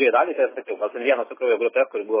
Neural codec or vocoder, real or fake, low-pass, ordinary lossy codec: none; real; 3.6 kHz; MP3, 16 kbps